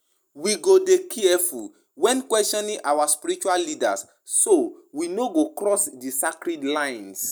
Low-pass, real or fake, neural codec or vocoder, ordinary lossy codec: none; real; none; none